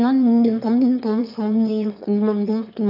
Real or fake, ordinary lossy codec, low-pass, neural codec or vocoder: fake; none; 5.4 kHz; autoencoder, 22.05 kHz, a latent of 192 numbers a frame, VITS, trained on one speaker